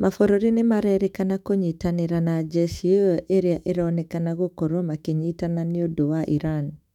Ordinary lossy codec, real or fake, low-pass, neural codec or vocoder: none; fake; 19.8 kHz; autoencoder, 48 kHz, 128 numbers a frame, DAC-VAE, trained on Japanese speech